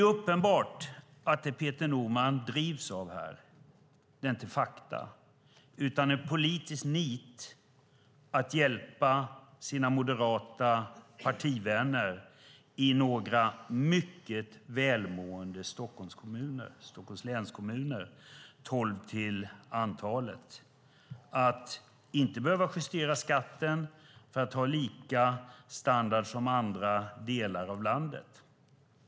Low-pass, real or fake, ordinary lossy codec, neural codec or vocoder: none; real; none; none